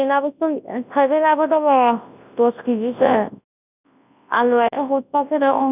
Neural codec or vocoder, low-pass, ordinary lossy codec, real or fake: codec, 24 kHz, 0.9 kbps, WavTokenizer, large speech release; 3.6 kHz; none; fake